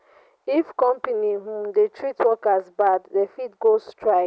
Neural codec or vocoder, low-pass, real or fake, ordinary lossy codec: none; none; real; none